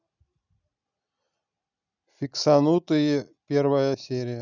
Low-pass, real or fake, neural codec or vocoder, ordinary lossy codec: 7.2 kHz; real; none; none